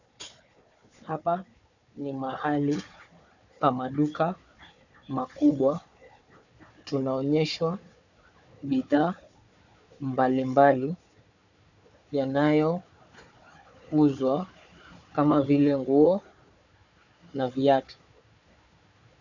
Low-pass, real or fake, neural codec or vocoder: 7.2 kHz; fake; codec, 16 kHz, 4 kbps, FunCodec, trained on Chinese and English, 50 frames a second